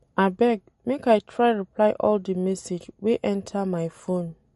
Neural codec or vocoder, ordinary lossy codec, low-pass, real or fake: none; MP3, 48 kbps; 19.8 kHz; real